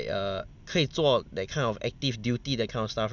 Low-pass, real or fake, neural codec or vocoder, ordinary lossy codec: 7.2 kHz; real; none; none